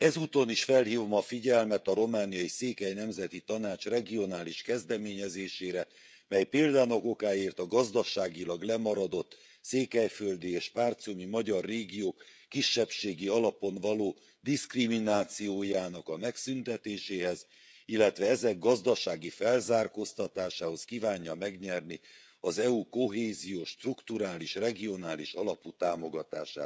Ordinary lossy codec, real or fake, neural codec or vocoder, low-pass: none; fake; codec, 16 kHz, 16 kbps, FreqCodec, smaller model; none